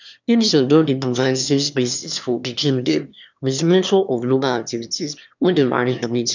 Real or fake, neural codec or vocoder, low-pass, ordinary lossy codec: fake; autoencoder, 22.05 kHz, a latent of 192 numbers a frame, VITS, trained on one speaker; 7.2 kHz; none